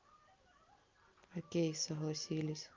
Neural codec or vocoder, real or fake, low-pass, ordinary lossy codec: none; real; 7.2 kHz; Opus, 32 kbps